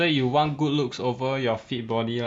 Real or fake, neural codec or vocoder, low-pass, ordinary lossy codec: real; none; 9.9 kHz; none